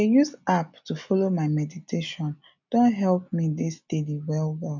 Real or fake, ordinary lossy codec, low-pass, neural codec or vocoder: real; none; 7.2 kHz; none